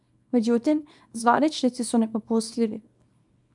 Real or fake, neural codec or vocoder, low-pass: fake; codec, 24 kHz, 0.9 kbps, WavTokenizer, small release; 10.8 kHz